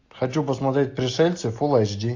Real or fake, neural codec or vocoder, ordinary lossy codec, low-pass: real; none; AAC, 48 kbps; 7.2 kHz